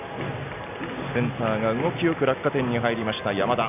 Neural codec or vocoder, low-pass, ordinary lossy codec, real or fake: none; 3.6 kHz; none; real